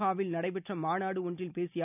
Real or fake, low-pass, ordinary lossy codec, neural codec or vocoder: real; 3.6 kHz; none; none